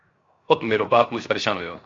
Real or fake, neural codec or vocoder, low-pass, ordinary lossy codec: fake; codec, 16 kHz, 0.7 kbps, FocalCodec; 7.2 kHz; AAC, 32 kbps